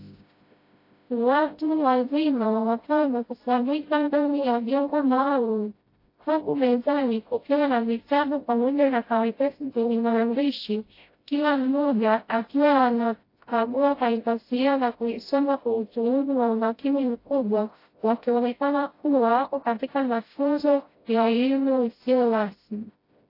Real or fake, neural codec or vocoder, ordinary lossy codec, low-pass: fake; codec, 16 kHz, 0.5 kbps, FreqCodec, smaller model; AAC, 32 kbps; 5.4 kHz